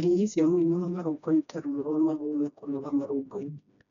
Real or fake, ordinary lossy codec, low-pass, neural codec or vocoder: fake; none; 7.2 kHz; codec, 16 kHz, 1 kbps, FreqCodec, smaller model